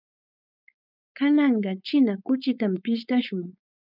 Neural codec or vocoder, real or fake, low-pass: codec, 16 kHz, 4.8 kbps, FACodec; fake; 5.4 kHz